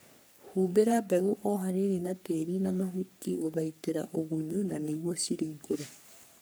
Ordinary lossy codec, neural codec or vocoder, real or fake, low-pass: none; codec, 44.1 kHz, 3.4 kbps, Pupu-Codec; fake; none